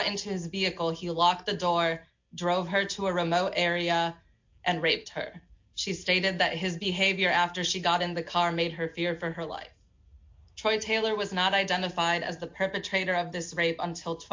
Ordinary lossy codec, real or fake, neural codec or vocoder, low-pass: MP3, 48 kbps; real; none; 7.2 kHz